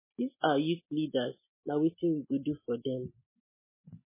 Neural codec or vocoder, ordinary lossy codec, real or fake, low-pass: none; MP3, 16 kbps; real; 3.6 kHz